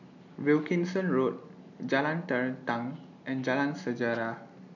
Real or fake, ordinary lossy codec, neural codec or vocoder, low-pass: real; none; none; 7.2 kHz